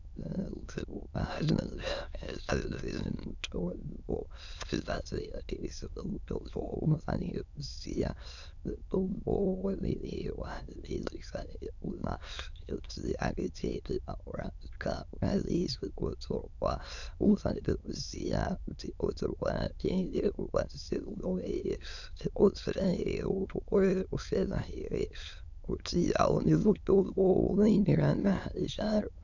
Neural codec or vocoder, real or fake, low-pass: autoencoder, 22.05 kHz, a latent of 192 numbers a frame, VITS, trained on many speakers; fake; 7.2 kHz